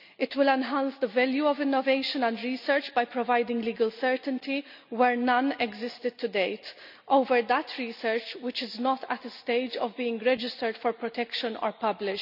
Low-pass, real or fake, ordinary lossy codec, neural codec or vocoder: 5.4 kHz; real; none; none